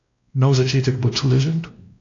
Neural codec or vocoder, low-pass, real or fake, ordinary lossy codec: codec, 16 kHz, 1 kbps, X-Codec, WavLM features, trained on Multilingual LibriSpeech; 7.2 kHz; fake; AAC, 48 kbps